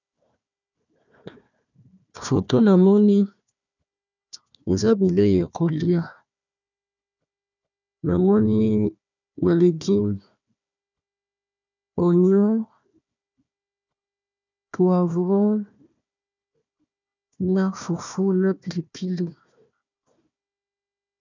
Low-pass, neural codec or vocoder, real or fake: 7.2 kHz; codec, 16 kHz, 1 kbps, FunCodec, trained on Chinese and English, 50 frames a second; fake